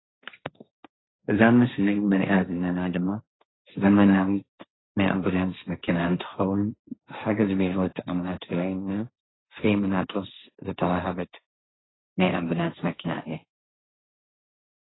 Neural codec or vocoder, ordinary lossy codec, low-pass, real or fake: codec, 16 kHz, 1.1 kbps, Voila-Tokenizer; AAC, 16 kbps; 7.2 kHz; fake